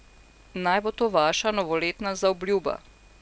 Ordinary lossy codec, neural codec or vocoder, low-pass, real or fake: none; none; none; real